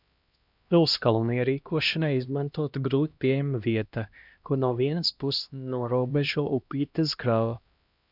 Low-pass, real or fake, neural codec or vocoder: 5.4 kHz; fake; codec, 16 kHz, 1 kbps, X-Codec, HuBERT features, trained on LibriSpeech